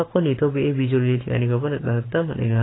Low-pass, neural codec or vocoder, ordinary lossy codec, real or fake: 7.2 kHz; codec, 44.1 kHz, 7.8 kbps, Pupu-Codec; AAC, 16 kbps; fake